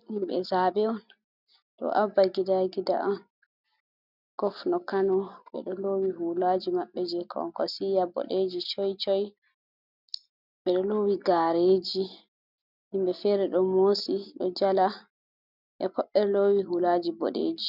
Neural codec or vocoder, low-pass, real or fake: none; 5.4 kHz; real